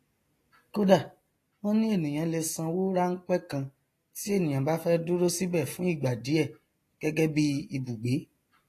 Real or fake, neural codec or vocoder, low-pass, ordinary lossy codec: real; none; 14.4 kHz; AAC, 48 kbps